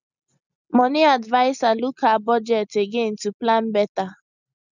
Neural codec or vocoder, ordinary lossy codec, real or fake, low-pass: none; none; real; 7.2 kHz